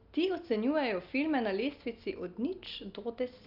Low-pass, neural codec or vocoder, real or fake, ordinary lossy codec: 5.4 kHz; none; real; Opus, 32 kbps